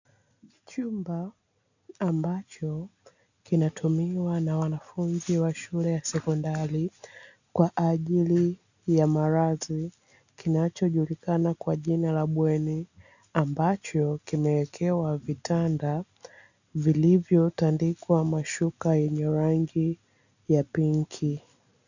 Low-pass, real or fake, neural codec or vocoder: 7.2 kHz; real; none